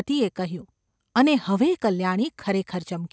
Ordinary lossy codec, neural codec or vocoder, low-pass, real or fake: none; none; none; real